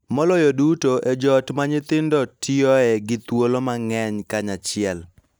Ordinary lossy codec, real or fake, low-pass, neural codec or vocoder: none; real; none; none